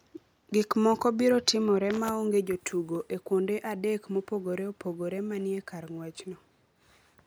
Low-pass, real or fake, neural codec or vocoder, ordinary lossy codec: none; real; none; none